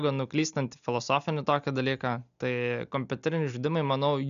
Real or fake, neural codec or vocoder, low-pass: real; none; 7.2 kHz